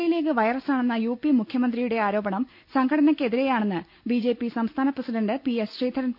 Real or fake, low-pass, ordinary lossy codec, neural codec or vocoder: real; 5.4 kHz; none; none